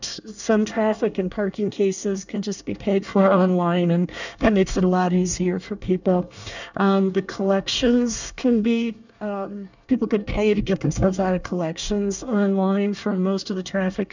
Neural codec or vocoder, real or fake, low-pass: codec, 24 kHz, 1 kbps, SNAC; fake; 7.2 kHz